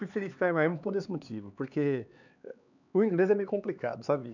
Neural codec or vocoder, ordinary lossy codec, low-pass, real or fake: codec, 16 kHz, 4 kbps, X-Codec, HuBERT features, trained on LibriSpeech; none; 7.2 kHz; fake